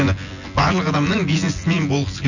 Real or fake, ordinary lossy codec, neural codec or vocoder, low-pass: fake; none; vocoder, 24 kHz, 100 mel bands, Vocos; 7.2 kHz